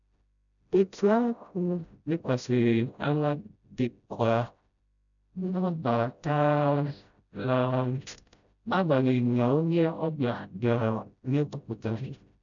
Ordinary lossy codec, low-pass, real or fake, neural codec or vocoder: none; 7.2 kHz; fake; codec, 16 kHz, 0.5 kbps, FreqCodec, smaller model